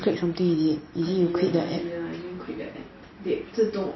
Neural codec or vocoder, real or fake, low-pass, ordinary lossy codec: none; real; 7.2 kHz; MP3, 24 kbps